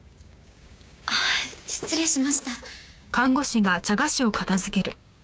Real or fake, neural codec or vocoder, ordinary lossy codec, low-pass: fake; codec, 16 kHz, 6 kbps, DAC; none; none